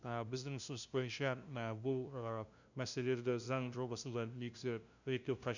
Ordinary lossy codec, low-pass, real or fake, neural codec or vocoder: none; 7.2 kHz; fake; codec, 16 kHz, 0.5 kbps, FunCodec, trained on LibriTTS, 25 frames a second